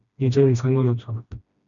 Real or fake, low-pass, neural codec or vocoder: fake; 7.2 kHz; codec, 16 kHz, 1 kbps, FreqCodec, smaller model